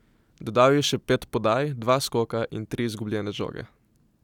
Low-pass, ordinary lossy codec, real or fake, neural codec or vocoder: 19.8 kHz; none; real; none